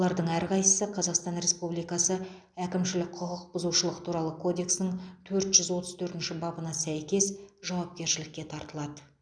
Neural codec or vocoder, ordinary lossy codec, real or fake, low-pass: none; none; real; 9.9 kHz